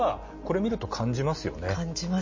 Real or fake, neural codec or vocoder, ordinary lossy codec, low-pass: real; none; MP3, 32 kbps; 7.2 kHz